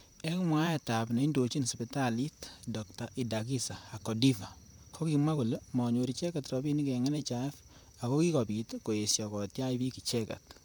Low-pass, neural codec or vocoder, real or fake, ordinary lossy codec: none; vocoder, 44.1 kHz, 128 mel bands, Pupu-Vocoder; fake; none